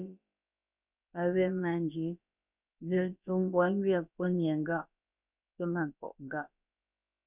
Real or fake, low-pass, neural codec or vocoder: fake; 3.6 kHz; codec, 16 kHz, about 1 kbps, DyCAST, with the encoder's durations